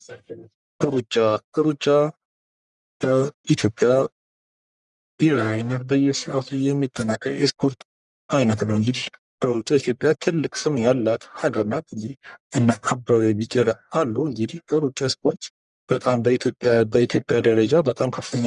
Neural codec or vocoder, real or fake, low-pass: codec, 44.1 kHz, 1.7 kbps, Pupu-Codec; fake; 10.8 kHz